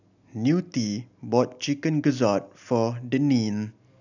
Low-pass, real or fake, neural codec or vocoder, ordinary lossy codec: 7.2 kHz; real; none; none